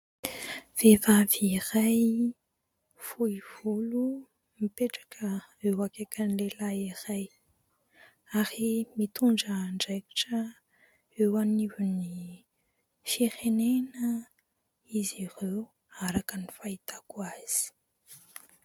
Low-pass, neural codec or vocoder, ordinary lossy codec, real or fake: 19.8 kHz; none; MP3, 96 kbps; real